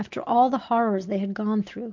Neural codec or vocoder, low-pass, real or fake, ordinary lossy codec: none; 7.2 kHz; real; MP3, 48 kbps